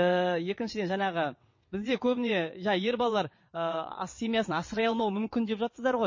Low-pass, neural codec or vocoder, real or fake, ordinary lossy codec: 7.2 kHz; vocoder, 22.05 kHz, 80 mel bands, WaveNeXt; fake; MP3, 32 kbps